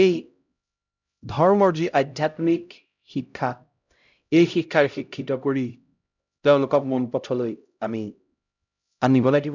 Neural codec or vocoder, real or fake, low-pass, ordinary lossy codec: codec, 16 kHz, 0.5 kbps, X-Codec, HuBERT features, trained on LibriSpeech; fake; 7.2 kHz; none